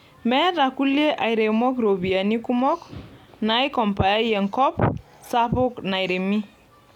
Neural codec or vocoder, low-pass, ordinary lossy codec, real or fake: none; 19.8 kHz; none; real